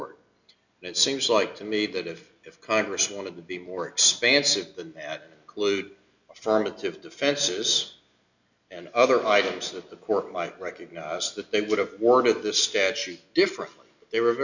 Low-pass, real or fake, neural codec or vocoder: 7.2 kHz; real; none